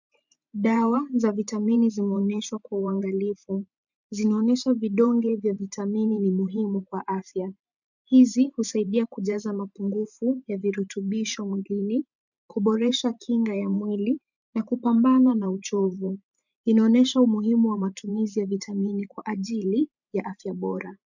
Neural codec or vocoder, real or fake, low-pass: vocoder, 44.1 kHz, 128 mel bands every 512 samples, BigVGAN v2; fake; 7.2 kHz